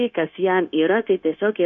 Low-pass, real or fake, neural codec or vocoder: 7.2 kHz; fake; codec, 16 kHz, 0.9 kbps, LongCat-Audio-Codec